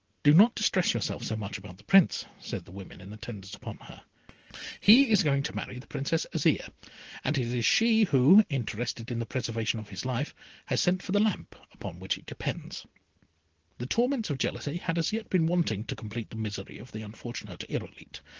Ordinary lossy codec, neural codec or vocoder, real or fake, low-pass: Opus, 16 kbps; none; real; 7.2 kHz